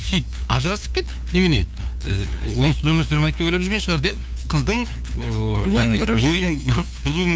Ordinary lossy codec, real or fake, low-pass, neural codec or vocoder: none; fake; none; codec, 16 kHz, 2 kbps, FunCodec, trained on LibriTTS, 25 frames a second